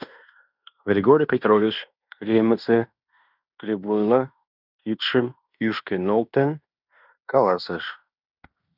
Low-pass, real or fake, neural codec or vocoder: 5.4 kHz; fake; codec, 16 kHz in and 24 kHz out, 0.9 kbps, LongCat-Audio-Codec, fine tuned four codebook decoder